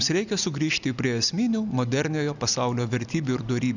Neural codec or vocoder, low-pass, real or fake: none; 7.2 kHz; real